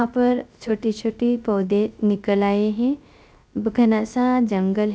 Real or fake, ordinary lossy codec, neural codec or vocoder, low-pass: fake; none; codec, 16 kHz, 0.3 kbps, FocalCodec; none